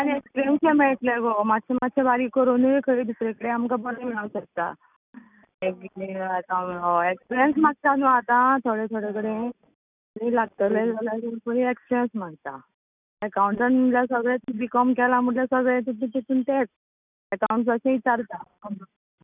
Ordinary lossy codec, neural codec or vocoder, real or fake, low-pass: none; none; real; 3.6 kHz